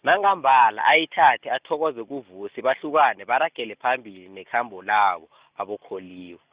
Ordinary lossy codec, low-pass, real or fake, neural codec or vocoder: Opus, 64 kbps; 3.6 kHz; real; none